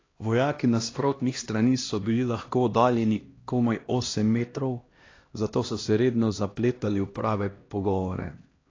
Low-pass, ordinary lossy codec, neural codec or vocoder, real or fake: 7.2 kHz; AAC, 32 kbps; codec, 16 kHz, 1 kbps, X-Codec, HuBERT features, trained on LibriSpeech; fake